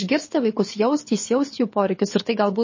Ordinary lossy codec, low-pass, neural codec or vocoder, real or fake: MP3, 32 kbps; 7.2 kHz; codec, 24 kHz, 6 kbps, HILCodec; fake